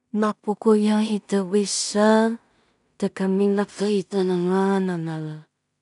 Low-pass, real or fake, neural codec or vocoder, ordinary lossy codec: 10.8 kHz; fake; codec, 16 kHz in and 24 kHz out, 0.4 kbps, LongCat-Audio-Codec, two codebook decoder; none